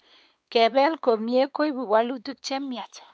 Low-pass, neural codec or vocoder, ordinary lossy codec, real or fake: none; codec, 16 kHz, 4 kbps, X-Codec, WavLM features, trained on Multilingual LibriSpeech; none; fake